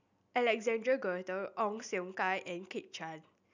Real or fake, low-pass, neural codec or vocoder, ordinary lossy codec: real; 7.2 kHz; none; none